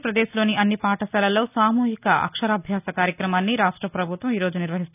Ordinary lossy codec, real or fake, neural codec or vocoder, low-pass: none; real; none; 3.6 kHz